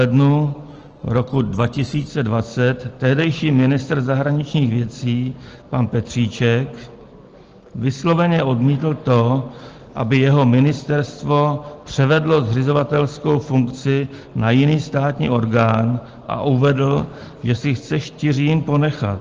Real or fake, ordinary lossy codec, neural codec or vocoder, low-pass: real; Opus, 16 kbps; none; 7.2 kHz